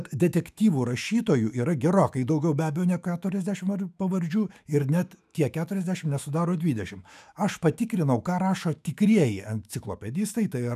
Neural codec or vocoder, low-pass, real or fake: autoencoder, 48 kHz, 128 numbers a frame, DAC-VAE, trained on Japanese speech; 14.4 kHz; fake